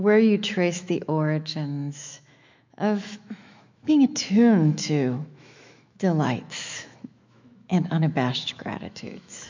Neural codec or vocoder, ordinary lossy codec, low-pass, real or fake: autoencoder, 48 kHz, 128 numbers a frame, DAC-VAE, trained on Japanese speech; MP3, 64 kbps; 7.2 kHz; fake